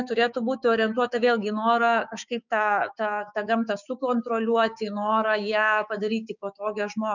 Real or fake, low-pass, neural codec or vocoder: fake; 7.2 kHz; codec, 16 kHz, 6 kbps, DAC